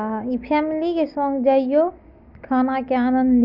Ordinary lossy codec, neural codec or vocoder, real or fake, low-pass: MP3, 48 kbps; none; real; 5.4 kHz